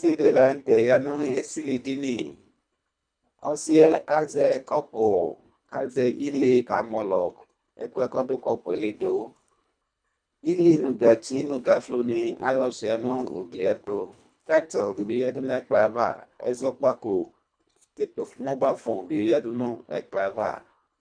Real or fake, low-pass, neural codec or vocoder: fake; 9.9 kHz; codec, 24 kHz, 1.5 kbps, HILCodec